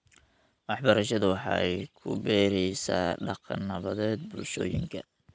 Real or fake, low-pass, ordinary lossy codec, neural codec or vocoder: real; none; none; none